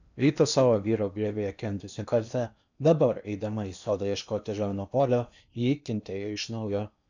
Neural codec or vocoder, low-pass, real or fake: codec, 16 kHz in and 24 kHz out, 0.8 kbps, FocalCodec, streaming, 65536 codes; 7.2 kHz; fake